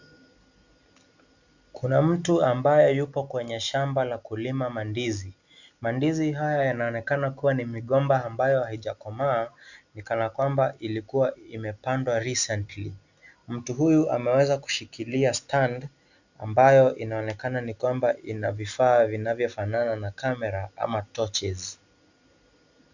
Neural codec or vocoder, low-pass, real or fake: none; 7.2 kHz; real